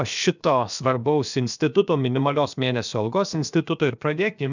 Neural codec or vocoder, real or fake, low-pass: codec, 16 kHz, about 1 kbps, DyCAST, with the encoder's durations; fake; 7.2 kHz